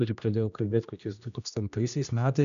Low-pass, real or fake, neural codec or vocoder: 7.2 kHz; fake; codec, 16 kHz, 1 kbps, X-Codec, HuBERT features, trained on general audio